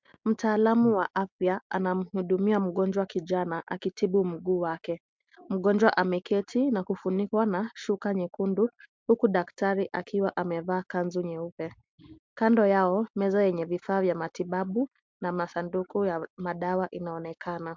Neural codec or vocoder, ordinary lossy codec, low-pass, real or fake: none; MP3, 64 kbps; 7.2 kHz; real